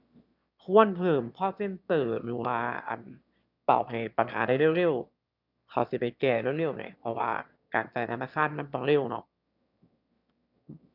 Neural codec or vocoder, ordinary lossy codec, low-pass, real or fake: autoencoder, 22.05 kHz, a latent of 192 numbers a frame, VITS, trained on one speaker; none; 5.4 kHz; fake